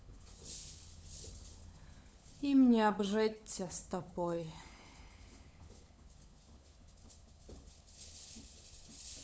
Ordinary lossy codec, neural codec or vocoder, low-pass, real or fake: none; codec, 16 kHz, 16 kbps, FunCodec, trained on LibriTTS, 50 frames a second; none; fake